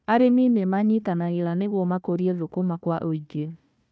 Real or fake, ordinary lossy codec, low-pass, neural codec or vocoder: fake; none; none; codec, 16 kHz, 1 kbps, FunCodec, trained on Chinese and English, 50 frames a second